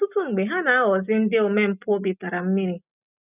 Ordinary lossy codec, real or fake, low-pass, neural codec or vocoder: none; real; 3.6 kHz; none